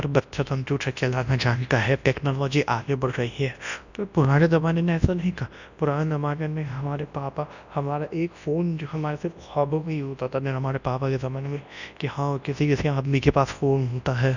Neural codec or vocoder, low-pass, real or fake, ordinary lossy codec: codec, 24 kHz, 0.9 kbps, WavTokenizer, large speech release; 7.2 kHz; fake; none